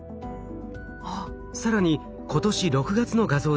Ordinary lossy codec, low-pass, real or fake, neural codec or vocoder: none; none; real; none